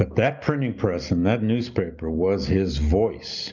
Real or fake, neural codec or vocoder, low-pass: real; none; 7.2 kHz